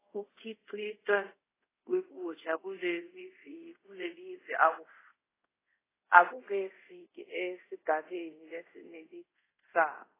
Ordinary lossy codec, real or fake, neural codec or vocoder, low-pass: AAC, 16 kbps; fake; codec, 24 kHz, 0.5 kbps, DualCodec; 3.6 kHz